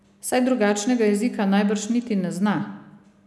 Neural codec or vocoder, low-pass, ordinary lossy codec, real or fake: none; none; none; real